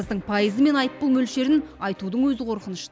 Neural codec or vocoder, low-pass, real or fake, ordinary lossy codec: none; none; real; none